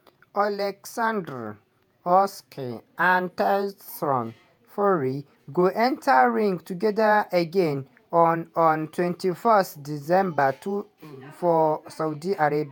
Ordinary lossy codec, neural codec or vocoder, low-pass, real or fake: none; vocoder, 48 kHz, 128 mel bands, Vocos; none; fake